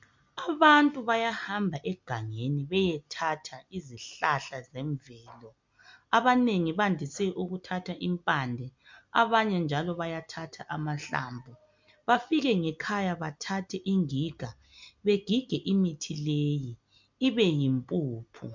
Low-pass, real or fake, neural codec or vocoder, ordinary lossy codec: 7.2 kHz; real; none; MP3, 64 kbps